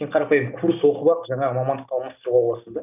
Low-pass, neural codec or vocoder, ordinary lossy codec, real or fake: 3.6 kHz; none; none; real